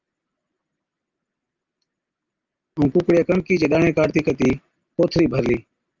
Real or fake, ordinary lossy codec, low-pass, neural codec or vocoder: real; Opus, 24 kbps; 7.2 kHz; none